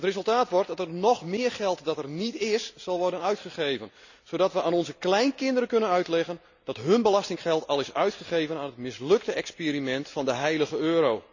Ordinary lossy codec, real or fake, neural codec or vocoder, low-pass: none; real; none; 7.2 kHz